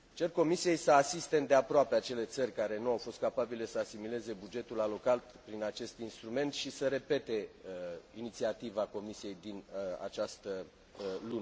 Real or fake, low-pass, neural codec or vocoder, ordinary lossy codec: real; none; none; none